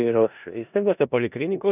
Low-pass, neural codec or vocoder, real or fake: 3.6 kHz; codec, 16 kHz in and 24 kHz out, 0.4 kbps, LongCat-Audio-Codec, four codebook decoder; fake